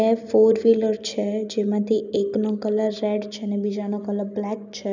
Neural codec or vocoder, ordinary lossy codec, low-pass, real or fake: none; none; 7.2 kHz; real